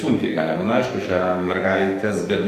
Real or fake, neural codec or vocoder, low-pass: fake; codec, 44.1 kHz, 2.6 kbps, SNAC; 14.4 kHz